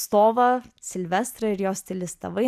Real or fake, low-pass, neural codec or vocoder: real; 14.4 kHz; none